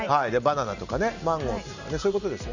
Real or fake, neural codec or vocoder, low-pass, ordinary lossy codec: real; none; 7.2 kHz; none